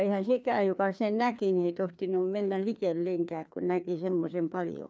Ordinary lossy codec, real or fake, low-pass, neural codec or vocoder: none; fake; none; codec, 16 kHz, 2 kbps, FreqCodec, larger model